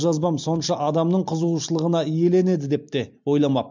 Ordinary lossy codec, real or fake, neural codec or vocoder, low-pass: MP3, 64 kbps; real; none; 7.2 kHz